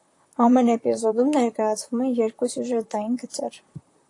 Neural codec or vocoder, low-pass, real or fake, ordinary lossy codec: vocoder, 44.1 kHz, 128 mel bands, Pupu-Vocoder; 10.8 kHz; fake; AAC, 48 kbps